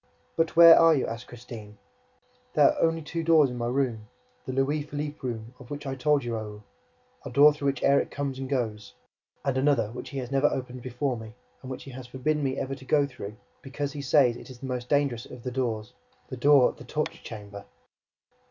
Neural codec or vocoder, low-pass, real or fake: none; 7.2 kHz; real